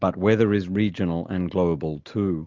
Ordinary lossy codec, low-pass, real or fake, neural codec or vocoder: Opus, 32 kbps; 7.2 kHz; real; none